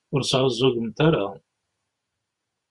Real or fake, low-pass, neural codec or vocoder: fake; 10.8 kHz; vocoder, 48 kHz, 128 mel bands, Vocos